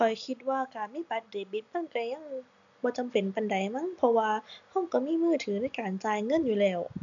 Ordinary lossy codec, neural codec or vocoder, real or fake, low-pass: none; none; real; 7.2 kHz